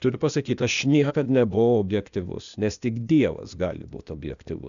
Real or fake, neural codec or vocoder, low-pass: fake; codec, 16 kHz, 0.8 kbps, ZipCodec; 7.2 kHz